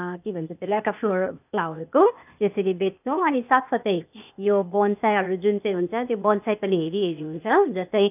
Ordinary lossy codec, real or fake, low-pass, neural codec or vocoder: none; fake; 3.6 kHz; codec, 16 kHz, 0.8 kbps, ZipCodec